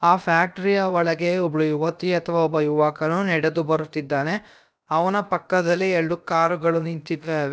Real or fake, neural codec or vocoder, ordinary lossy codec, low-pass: fake; codec, 16 kHz, about 1 kbps, DyCAST, with the encoder's durations; none; none